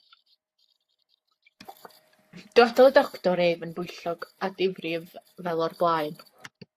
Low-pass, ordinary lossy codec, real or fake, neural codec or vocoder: 14.4 kHz; AAC, 64 kbps; fake; codec, 44.1 kHz, 7.8 kbps, Pupu-Codec